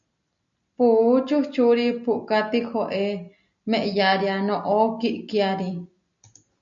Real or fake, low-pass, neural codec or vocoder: real; 7.2 kHz; none